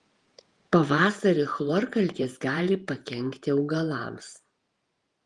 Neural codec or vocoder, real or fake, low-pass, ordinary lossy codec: vocoder, 48 kHz, 128 mel bands, Vocos; fake; 10.8 kHz; Opus, 24 kbps